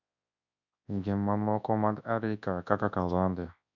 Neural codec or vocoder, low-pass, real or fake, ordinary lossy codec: codec, 24 kHz, 0.9 kbps, WavTokenizer, large speech release; 7.2 kHz; fake; MP3, 64 kbps